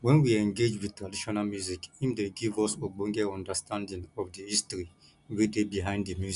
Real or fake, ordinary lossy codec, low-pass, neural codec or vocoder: real; none; 10.8 kHz; none